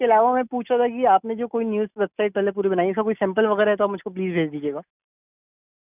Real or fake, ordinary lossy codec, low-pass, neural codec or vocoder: real; none; 3.6 kHz; none